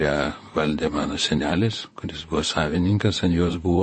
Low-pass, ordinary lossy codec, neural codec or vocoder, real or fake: 9.9 kHz; MP3, 32 kbps; vocoder, 44.1 kHz, 128 mel bands, Pupu-Vocoder; fake